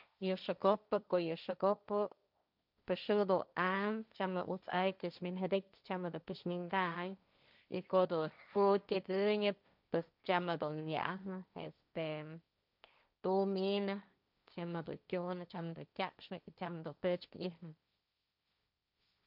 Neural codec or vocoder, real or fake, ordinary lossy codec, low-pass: codec, 16 kHz, 1.1 kbps, Voila-Tokenizer; fake; none; 5.4 kHz